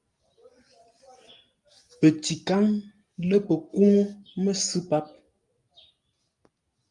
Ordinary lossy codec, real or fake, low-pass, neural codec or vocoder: Opus, 32 kbps; real; 10.8 kHz; none